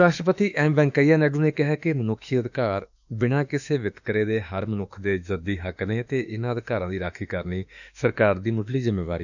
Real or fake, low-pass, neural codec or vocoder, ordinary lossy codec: fake; 7.2 kHz; autoencoder, 48 kHz, 32 numbers a frame, DAC-VAE, trained on Japanese speech; none